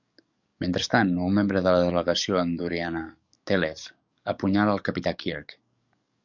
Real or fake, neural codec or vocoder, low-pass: fake; codec, 44.1 kHz, 7.8 kbps, DAC; 7.2 kHz